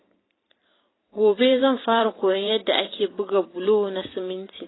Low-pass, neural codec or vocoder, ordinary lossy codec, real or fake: 7.2 kHz; vocoder, 44.1 kHz, 128 mel bands every 512 samples, BigVGAN v2; AAC, 16 kbps; fake